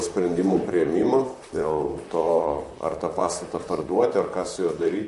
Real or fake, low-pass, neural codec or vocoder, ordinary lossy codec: fake; 14.4 kHz; vocoder, 44.1 kHz, 128 mel bands, Pupu-Vocoder; MP3, 48 kbps